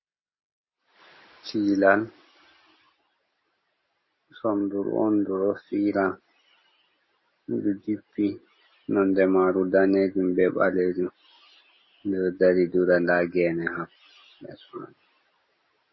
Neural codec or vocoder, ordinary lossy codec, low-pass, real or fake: none; MP3, 24 kbps; 7.2 kHz; real